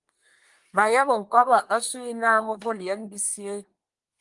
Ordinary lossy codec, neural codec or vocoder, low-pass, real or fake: Opus, 32 kbps; codec, 24 kHz, 1 kbps, SNAC; 10.8 kHz; fake